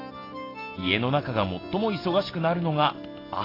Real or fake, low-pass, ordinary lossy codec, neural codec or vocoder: real; 5.4 kHz; AAC, 24 kbps; none